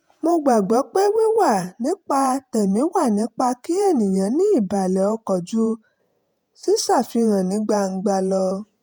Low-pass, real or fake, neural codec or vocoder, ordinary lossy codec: none; fake; vocoder, 48 kHz, 128 mel bands, Vocos; none